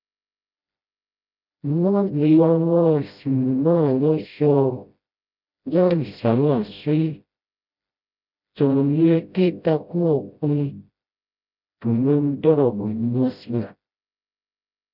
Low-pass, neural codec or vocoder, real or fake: 5.4 kHz; codec, 16 kHz, 0.5 kbps, FreqCodec, smaller model; fake